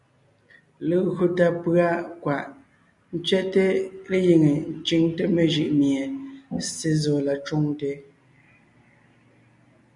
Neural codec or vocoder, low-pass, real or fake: none; 10.8 kHz; real